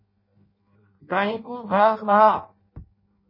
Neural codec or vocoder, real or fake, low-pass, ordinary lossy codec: codec, 16 kHz in and 24 kHz out, 0.6 kbps, FireRedTTS-2 codec; fake; 5.4 kHz; MP3, 24 kbps